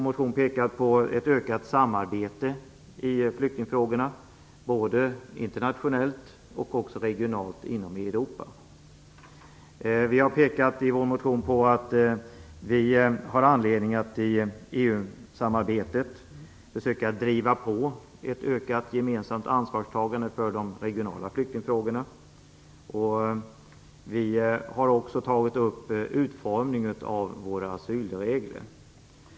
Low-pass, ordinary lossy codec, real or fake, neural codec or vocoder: none; none; real; none